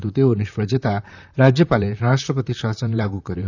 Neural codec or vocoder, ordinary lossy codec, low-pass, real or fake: vocoder, 44.1 kHz, 128 mel bands, Pupu-Vocoder; none; 7.2 kHz; fake